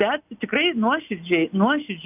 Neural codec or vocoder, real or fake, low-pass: none; real; 3.6 kHz